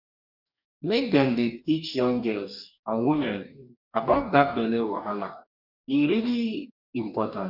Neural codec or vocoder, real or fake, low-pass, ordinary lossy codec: codec, 44.1 kHz, 2.6 kbps, DAC; fake; 5.4 kHz; none